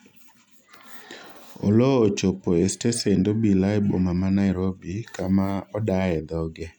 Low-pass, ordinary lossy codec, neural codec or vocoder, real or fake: 19.8 kHz; none; none; real